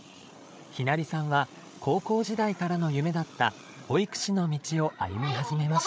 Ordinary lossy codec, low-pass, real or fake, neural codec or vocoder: none; none; fake; codec, 16 kHz, 8 kbps, FreqCodec, larger model